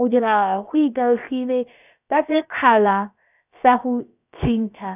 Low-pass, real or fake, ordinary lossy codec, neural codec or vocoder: 3.6 kHz; fake; none; codec, 16 kHz, about 1 kbps, DyCAST, with the encoder's durations